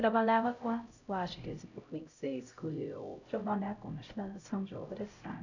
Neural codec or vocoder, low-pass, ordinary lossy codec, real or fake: codec, 16 kHz, 0.5 kbps, X-Codec, HuBERT features, trained on LibriSpeech; 7.2 kHz; none; fake